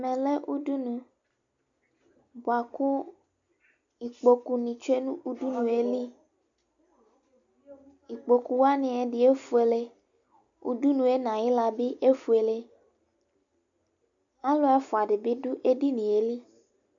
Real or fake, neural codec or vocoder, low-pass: real; none; 7.2 kHz